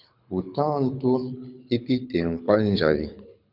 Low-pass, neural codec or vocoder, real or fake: 5.4 kHz; codec, 24 kHz, 6 kbps, HILCodec; fake